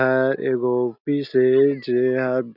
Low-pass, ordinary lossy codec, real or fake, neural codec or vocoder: 5.4 kHz; none; real; none